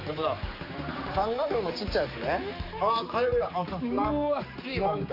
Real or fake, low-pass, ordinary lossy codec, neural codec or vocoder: fake; 5.4 kHz; AAC, 32 kbps; codec, 16 kHz, 4 kbps, X-Codec, HuBERT features, trained on general audio